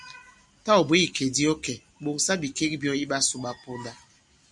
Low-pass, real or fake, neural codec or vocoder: 10.8 kHz; real; none